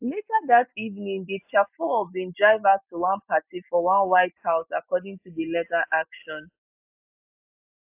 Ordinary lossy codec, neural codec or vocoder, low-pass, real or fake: AAC, 32 kbps; none; 3.6 kHz; real